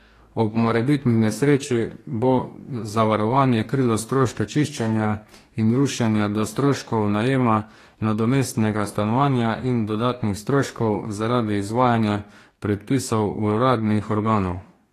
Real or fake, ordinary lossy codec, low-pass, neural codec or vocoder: fake; AAC, 48 kbps; 14.4 kHz; codec, 44.1 kHz, 2.6 kbps, DAC